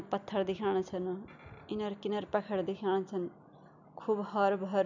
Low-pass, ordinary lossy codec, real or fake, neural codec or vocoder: 7.2 kHz; none; real; none